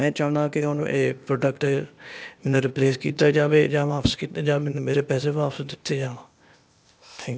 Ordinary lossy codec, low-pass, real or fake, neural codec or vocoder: none; none; fake; codec, 16 kHz, 0.8 kbps, ZipCodec